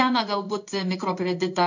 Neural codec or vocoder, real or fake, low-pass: codec, 16 kHz in and 24 kHz out, 1 kbps, XY-Tokenizer; fake; 7.2 kHz